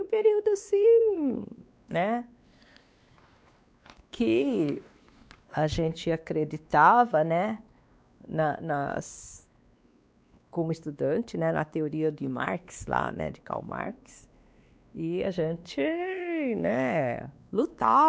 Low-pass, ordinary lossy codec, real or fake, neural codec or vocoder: none; none; fake; codec, 16 kHz, 2 kbps, X-Codec, WavLM features, trained on Multilingual LibriSpeech